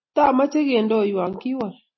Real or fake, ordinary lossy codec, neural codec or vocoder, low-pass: real; MP3, 24 kbps; none; 7.2 kHz